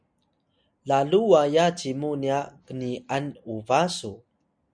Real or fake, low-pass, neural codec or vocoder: real; 9.9 kHz; none